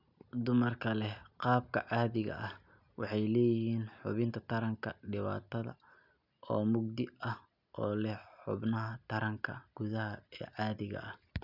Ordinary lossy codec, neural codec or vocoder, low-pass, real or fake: none; none; 5.4 kHz; real